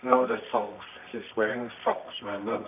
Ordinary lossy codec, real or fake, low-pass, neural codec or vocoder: none; fake; 3.6 kHz; codec, 24 kHz, 0.9 kbps, WavTokenizer, medium music audio release